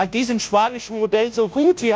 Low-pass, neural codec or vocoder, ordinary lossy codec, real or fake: none; codec, 16 kHz, 0.5 kbps, FunCodec, trained on Chinese and English, 25 frames a second; none; fake